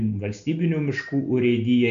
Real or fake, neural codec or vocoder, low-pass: real; none; 7.2 kHz